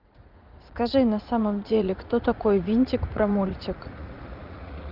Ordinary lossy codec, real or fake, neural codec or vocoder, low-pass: Opus, 24 kbps; fake; vocoder, 44.1 kHz, 80 mel bands, Vocos; 5.4 kHz